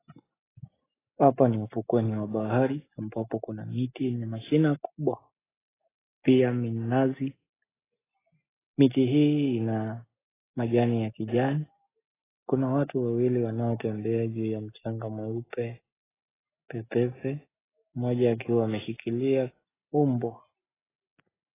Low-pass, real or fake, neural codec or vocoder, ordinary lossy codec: 3.6 kHz; real; none; AAC, 16 kbps